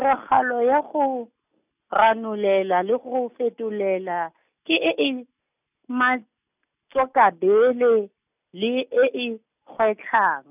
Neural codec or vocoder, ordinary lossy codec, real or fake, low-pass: none; none; real; 3.6 kHz